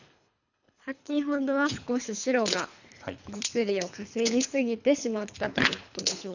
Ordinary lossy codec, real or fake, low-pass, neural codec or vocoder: none; fake; 7.2 kHz; codec, 24 kHz, 3 kbps, HILCodec